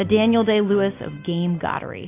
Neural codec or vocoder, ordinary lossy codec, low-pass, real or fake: none; AAC, 24 kbps; 3.6 kHz; real